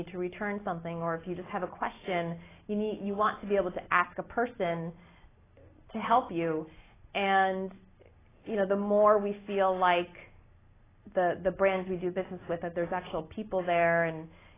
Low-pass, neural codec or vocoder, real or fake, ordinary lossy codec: 3.6 kHz; none; real; AAC, 16 kbps